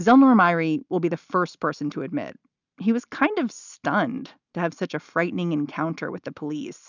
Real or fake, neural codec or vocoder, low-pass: real; none; 7.2 kHz